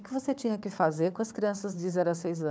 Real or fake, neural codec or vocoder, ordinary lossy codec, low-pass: fake; codec, 16 kHz, 4 kbps, FunCodec, trained on Chinese and English, 50 frames a second; none; none